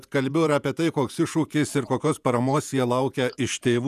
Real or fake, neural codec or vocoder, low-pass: fake; vocoder, 48 kHz, 128 mel bands, Vocos; 14.4 kHz